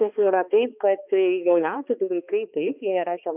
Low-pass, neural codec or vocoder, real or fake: 3.6 kHz; codec, 16 kHz, 2 kbps, X-Codec, HuBERT features, trained on balanced general audio; fake